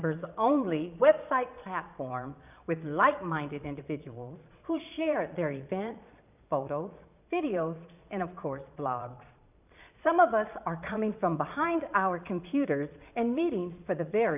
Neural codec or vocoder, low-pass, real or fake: vocoder, 22.05 kHz, 80 mel bands, Vocos; 3.6 kHz; fake